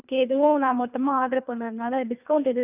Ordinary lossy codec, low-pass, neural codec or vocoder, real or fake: none; 3.6 kHz; codec, 24 kHz, 3 kbps, HILCodec; fake